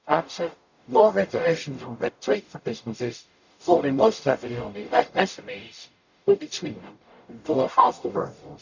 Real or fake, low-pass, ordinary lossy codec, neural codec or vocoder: fake; 7.2 kHz; none; codec, 44.1 kHz, 0.9 kbps, DAC